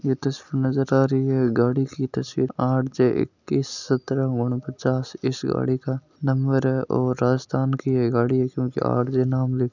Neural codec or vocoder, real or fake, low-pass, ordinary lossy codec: none; real; 7.2 kHz; none